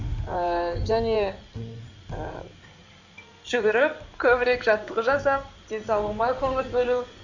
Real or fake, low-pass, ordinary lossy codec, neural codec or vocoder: fake; 7.2 kHz; none; codec, 16 kHz in and 24 kHz out, 2.2 kbps, FireRedTTS-2 codec